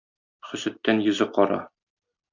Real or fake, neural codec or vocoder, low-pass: real; none; 7.2 kHz